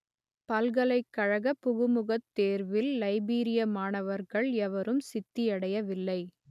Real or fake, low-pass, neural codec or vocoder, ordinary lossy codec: real; 14.4 kHz; none; none